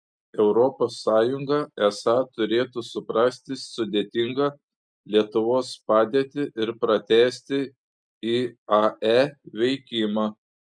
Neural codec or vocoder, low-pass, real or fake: none; 9.9 kHz; real